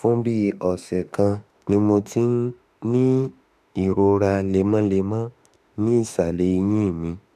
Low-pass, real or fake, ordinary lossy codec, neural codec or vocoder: 14.4 kHz; fake; none; autoencoder, 48 kHz, 32 numbers a frame, DAC-VAE, trained on Japanese speech